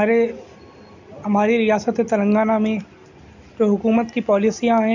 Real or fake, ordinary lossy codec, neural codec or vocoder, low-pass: real; none; none; 7.2 kHz